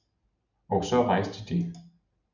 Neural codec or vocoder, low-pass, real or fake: none; 7.2 kHz; real